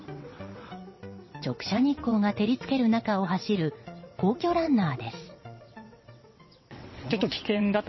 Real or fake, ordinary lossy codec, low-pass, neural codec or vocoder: fake; MP3, 24 kbps; 7.2 kHz; vocoder, 22.05 kHz, 80 mel bands, WaveNeXt